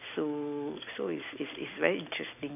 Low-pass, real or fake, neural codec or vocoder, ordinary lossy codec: 3.6 kHz; fake; vocoder, 44.1 kHz, 128 mel bands every 256 samples, BigVGAN v2; none